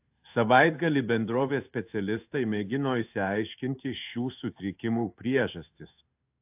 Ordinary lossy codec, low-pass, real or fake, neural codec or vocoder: AAC, 32 kbps; 3.6 kHz; fake; codec, 16 kHz in and 24 kHz out, 1 kbps, XY-Tokenizer